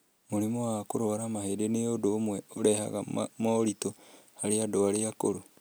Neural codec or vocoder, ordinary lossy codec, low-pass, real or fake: none; none; none; real